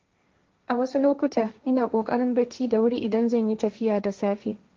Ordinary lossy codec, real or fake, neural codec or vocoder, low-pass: Opus, 32 kbps; fake; codec, 16 kHz, 1.1 kbps, Voila-Tokenizer; 7.2 kHz